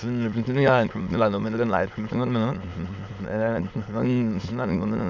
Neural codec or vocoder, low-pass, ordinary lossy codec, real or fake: autoencoder, 22.05 kHz, a latent of 192 numbers a frame, VITS, trained on many speakers; 7.2 kHz; none; fake